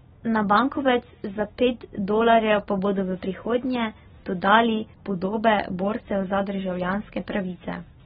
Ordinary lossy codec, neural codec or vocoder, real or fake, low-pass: AAC, 16 kbps; none; real; 19.8 kHz